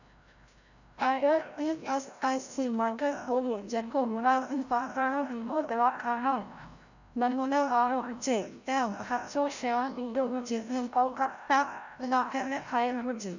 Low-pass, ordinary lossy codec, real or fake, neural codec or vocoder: 7.2 kHz; none; fake; codec, 16 kHz, 0.5 kbps, FreqCodec, larger model